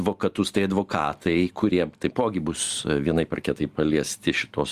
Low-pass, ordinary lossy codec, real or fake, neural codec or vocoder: 14.4 kHz; Opus, 32 kbps; real; none